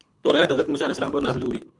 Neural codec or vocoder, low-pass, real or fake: codec, 24 kHz, 3 kbps, HILCodec; 10.8 kHz; fake